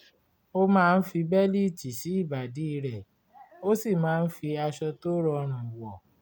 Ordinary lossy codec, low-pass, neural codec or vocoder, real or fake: none; none; none; real